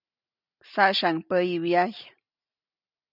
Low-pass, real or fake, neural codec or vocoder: 5.4 kHz; real; none